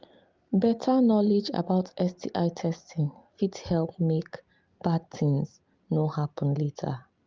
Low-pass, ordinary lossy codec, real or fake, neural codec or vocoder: 7.2 kHz; Opus, 24 kbps; real; none